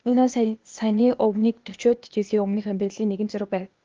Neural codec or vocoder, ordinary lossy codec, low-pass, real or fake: codec, 16 kHz, 0.8 kbps, ZipCodec; Opus, 32 kbps; 7.2 kHz; fake